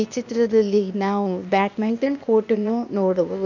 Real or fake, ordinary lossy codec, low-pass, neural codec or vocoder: fake; none; 7.2 kHz; codec, 16 kHz, 0.8 kbps, ZipCodec